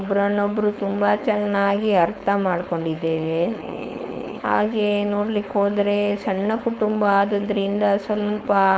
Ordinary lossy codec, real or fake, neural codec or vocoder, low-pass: none; fake; codec, 16 kHz, 4.8 kbps, FACodec; none